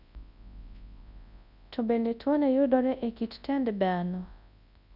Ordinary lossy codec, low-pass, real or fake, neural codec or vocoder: none; 5.4 kHz; fake; codec, 24 kHz, 0.9 kbps, WavTokenizer, large speech release